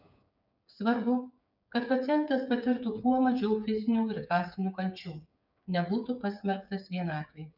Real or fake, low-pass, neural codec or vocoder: fake; 5.4 kHz; codec, 16 kHz, 8 kbps, FreqCodec, smaller model